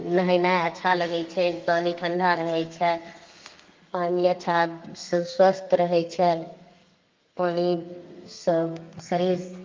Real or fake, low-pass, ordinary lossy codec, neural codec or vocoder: fake; 7.2 kHz; Opus, 32 kbps; codec, 32 kHz, 1.9 kbps, SNAC